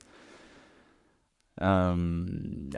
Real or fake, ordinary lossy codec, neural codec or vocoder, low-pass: fake; none; codec, 44.1 kHz, 7.8 kbps, Pupu-Codec; 10.8 kHz